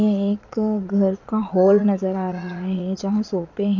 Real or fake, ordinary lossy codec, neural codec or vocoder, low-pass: fake; none; vocoder, 22.05 kHz, 80 mel bands, Vocos; 7.2 kHz